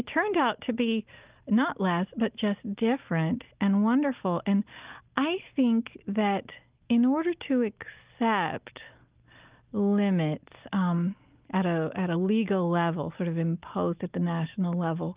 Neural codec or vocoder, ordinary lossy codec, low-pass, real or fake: none; Opus, 32 kbps; 3.6 kHz; real